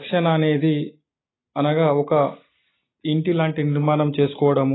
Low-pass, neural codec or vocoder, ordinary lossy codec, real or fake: 7.2 kHz; none; AAC, 16 kbps; real